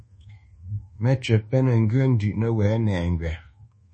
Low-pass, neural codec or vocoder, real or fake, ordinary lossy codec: 10.8 kHz; codec, 24 kHz, 1.2 kbps, DualCodec; fake; MP3, 32 kbps